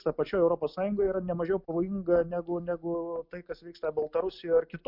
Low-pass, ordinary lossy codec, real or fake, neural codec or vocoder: 5.4 kHz; MP3, 48 kbps; real; none